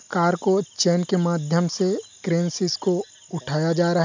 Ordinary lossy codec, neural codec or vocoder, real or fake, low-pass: MP3, 64 kbps; none; real; 7.2 kHz